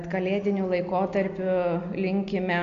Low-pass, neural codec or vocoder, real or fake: 7.2 kHz; none; real